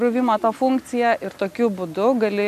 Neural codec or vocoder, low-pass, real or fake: none; 14.4 kHz; real